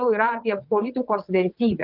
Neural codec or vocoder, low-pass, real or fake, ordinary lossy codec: vocoder, 22.05 kHz, 80 mel bands, WaveNeXt; 5.4 kHz; fake; Opus, 32 kbps